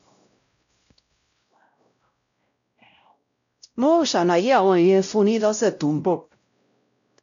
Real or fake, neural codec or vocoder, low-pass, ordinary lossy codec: fake; codec, 16 kHz, 0.5 kbps, X-Codec, WavLM features, trained on Multilingual LibriSpeech; 7.2 kHz; none